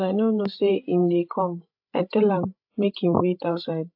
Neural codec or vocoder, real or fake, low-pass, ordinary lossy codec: codec, 16 kHz, 16 kbps, FreqCodec, larger model; fake; 5.4 kHz; AAC, 48 kbps